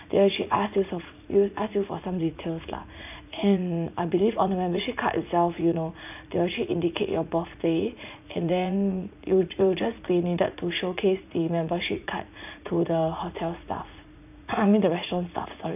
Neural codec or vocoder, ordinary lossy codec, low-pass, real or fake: vocoder, 44.1 kHz, 80 mel bands, Vocos; none; 3.6 kHz; fake